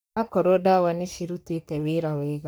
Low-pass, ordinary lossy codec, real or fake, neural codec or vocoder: none; none; fake; codec, 44.1 kHz, 3.4 kbps, Pupu-Codec